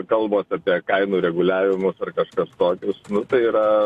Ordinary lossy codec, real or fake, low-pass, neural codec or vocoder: AAC, 64 kbps; real; 14.4 kHz; none